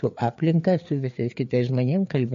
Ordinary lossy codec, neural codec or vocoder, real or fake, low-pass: MP3, 48 kbps; codec, 16 kHz, 4 kbps, FreqCodec, larger model; fake; 7.2 kHz